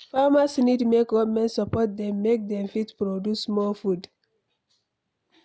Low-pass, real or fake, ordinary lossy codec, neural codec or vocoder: none; real; none; none